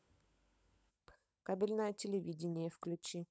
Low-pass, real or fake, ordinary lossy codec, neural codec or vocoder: none; fake; none; codec, 16 kHz, 16 kbps, FunCodec, trained on LibriTTS, 50 frames a second